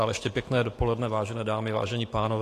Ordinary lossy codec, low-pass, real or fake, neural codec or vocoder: AAC, 48 kbps; 14.4 kHz; fake; autoencoder, 48 kHz, 128 numbers a frame, DAC-VAE, trained on Japanese speech